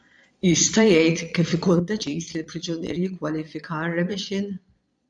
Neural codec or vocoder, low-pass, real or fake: vocoder, 22.05 kHz, 80 mel bands, Vocos; 9.9 kHz; fake